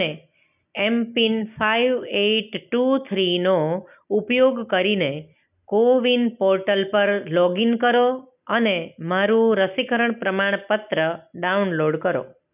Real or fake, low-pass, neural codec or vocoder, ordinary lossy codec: real; 3.6 kHz; none; none